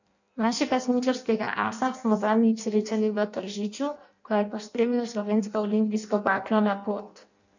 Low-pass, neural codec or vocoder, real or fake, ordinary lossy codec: 7.2 kHz; codec, 16 kHz in and 24 kHz out, 0.6 kbps, FireRedTTS-2 codec; fake; none